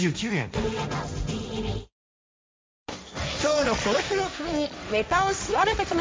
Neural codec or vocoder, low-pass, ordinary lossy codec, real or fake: codec, 16 kHz, 1.1 kbps, Voila-Tokenizer; none; none; fake